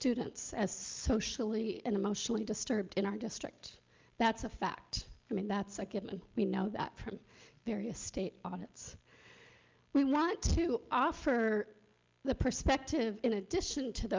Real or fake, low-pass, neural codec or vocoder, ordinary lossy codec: real; 7.2 kHz; none; Opus, 24 kbps